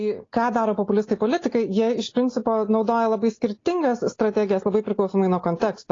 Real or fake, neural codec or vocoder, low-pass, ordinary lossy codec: real; none; 7.2 kHz; AAC, 32 kbps